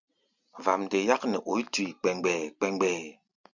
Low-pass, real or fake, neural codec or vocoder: 7.2 kHz; real; none